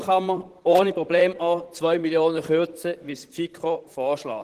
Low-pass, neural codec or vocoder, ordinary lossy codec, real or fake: 14.4 kHz; vocoder, 44.1 kHz, 128 mel bands, Pupu-Vocoder; Opus, 16 kbps; fake